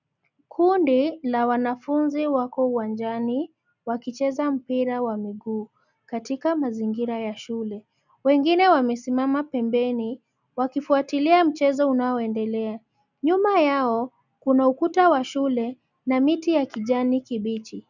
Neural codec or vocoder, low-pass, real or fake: none; 7.2 kHz; real